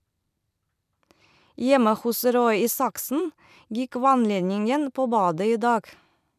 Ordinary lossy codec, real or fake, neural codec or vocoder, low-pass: none; fake; vocoder, 44.1 kHz, 128 mel bands every 256 samples, BigVGAN v2; 14.4 kHz